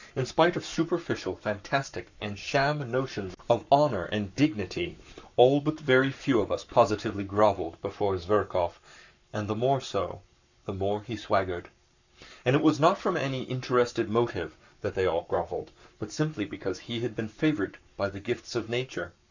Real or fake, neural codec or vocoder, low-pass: fake; codec, 44.1 kHz, 7.8 kbps, Pupu-Codec; 7.2 kHz